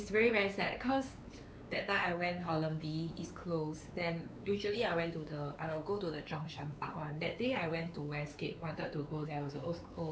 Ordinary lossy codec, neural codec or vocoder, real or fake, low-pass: none; codec, 16 kHz, 4 kbps, X-Codec, WavLM features, trained on Multilingual LibriSpeech; fake; none